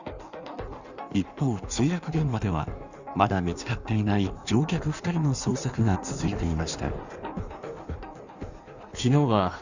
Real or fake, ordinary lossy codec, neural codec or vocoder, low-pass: fake; none; codec, 16 kHz in and 24 kHz out, 1.1 kbps, FireRedTTS-2 codec; 7.2 kHz